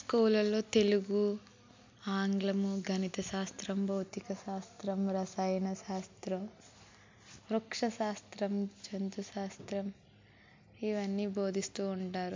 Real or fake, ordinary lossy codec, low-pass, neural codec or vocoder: real; MP3, 64 kbps; 7.2 kHz; none